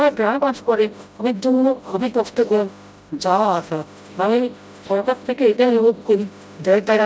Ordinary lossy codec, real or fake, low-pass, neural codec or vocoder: none; fake; none; codec, 16 kHz, 0.5 kbps, FreqCodec, smaller model